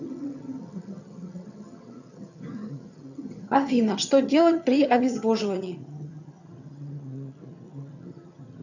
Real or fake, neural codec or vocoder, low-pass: fake; vocoder, 22.05 kHz, 80 mel bands, HiFi-GAN; 7.2 kHz